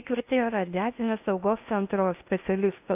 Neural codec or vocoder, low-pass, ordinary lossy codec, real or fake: codec, 16 kHz in and 24 kHz out, 0.8 kbps, FocalCodec, streaming, 65536 codes; 3.6 kHz; AAC, 32 kbps; fake